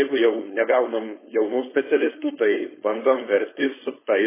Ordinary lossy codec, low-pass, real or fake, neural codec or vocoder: MP3, 16 kbps; 3.6 kHz; fake; codec, 16 kHz, 4.8 kbps, FACodec